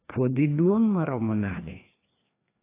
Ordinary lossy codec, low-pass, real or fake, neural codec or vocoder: AAC, 24 kbps; 3.6 kHz; fake; codec, 16 kHz, 2 kbps, FreqCodec, larger model